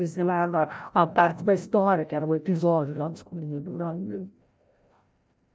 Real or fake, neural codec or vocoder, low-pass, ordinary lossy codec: fake; codec, 16 kHz, 0.5 kbps, FreqCodec, larger model; none; none